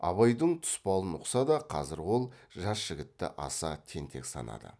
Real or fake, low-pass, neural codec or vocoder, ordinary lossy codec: real; none; none; none